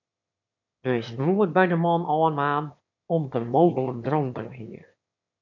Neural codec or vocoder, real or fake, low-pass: autoencoder, 22.05 kHz, a latent of 192 numbers a frame, VITS, trained on one speaker; fake; 7.2 kHz